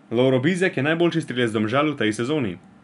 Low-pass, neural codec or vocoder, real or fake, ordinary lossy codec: 10.8 kHz; none; real; none